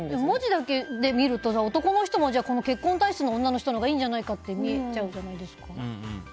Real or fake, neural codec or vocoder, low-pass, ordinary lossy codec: real; none; none; none